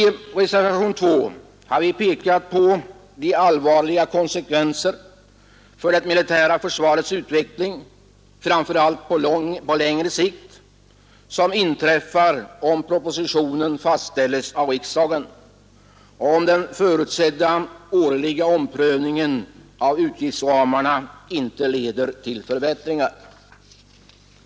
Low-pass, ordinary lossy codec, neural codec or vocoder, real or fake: none; none; none; real